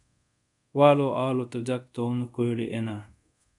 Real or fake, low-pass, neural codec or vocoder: fake; 10.8 kHz; codec, 24 kHz, 0.5 kbps, DualCodec